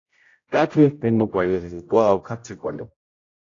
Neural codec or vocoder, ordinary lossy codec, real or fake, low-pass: codec, 16 kHz, 0.5 kbps, X-Codec, HuBERT features, trained on general audio; AAC, 32 kbps; fake; 7.2 kHz